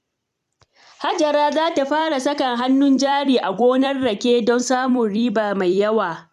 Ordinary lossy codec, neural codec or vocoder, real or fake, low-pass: none; vocoder, 44.1 kHz, 128 mel bands every 256 samples, BigVGAN v2; fake; 14.4 kHz